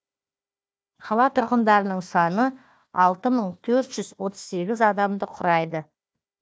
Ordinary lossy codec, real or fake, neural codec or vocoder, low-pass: none; fake; codec, 16 kHz, 1 kbps, FunCodec, trained on Chinese and English, 50 frames a second; none